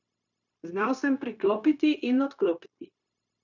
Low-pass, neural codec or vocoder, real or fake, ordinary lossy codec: 7.2 kHz; codec, 16 kHz, 0.9 kbps, LongCat-Audio-Codec; fake; none